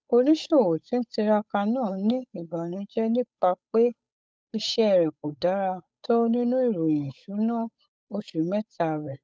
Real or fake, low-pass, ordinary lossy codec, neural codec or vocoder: fake; none; none; codec, 16 kHz, 8 kbps, FunCodec, trained on Chinese and English, 25 frames a second